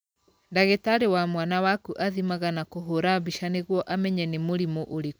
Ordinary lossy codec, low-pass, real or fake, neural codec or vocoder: none; none; real; none